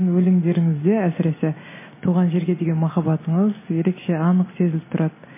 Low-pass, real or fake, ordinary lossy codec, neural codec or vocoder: 3.6 kHz; real; MP3, 16 kbps; none